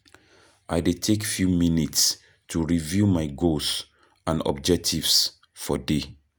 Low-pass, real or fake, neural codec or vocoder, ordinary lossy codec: none; real; none; none